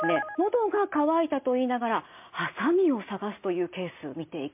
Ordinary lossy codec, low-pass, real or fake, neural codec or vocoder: MP3, 32 kbps; 3.6 kHz; real; none